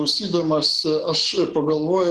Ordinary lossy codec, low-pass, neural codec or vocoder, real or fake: Opus, 16 kbps; 10.8 kHz; codec, 44.1 kHz, 7.8 kbps, DAC; fake